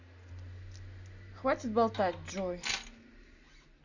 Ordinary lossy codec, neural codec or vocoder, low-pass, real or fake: none; none; 7.2 kHz; real